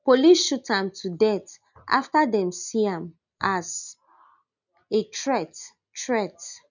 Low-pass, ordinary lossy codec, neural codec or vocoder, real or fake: 7.2 kHz; none; none; real